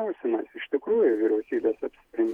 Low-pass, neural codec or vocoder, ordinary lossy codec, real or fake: 19.8 kHz; vocoder, 48 kHz, 128 mel bands, Vocos; Opus, 64 kbps; fake